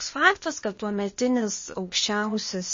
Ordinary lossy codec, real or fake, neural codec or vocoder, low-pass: MP3, 32 kbps; fake; codec, 16 kHz, 0.8 kbps, ZipCodec; 7.2 kHz